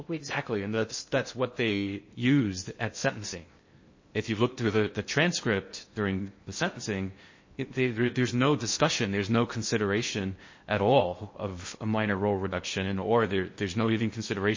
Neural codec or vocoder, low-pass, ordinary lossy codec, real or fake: codec, 16 kHz in and 24 kHz out, 0.6 kbps, FocalCodec, streaming, 2048 codes; 7.2 kHz; MP3, 32 kbps; fake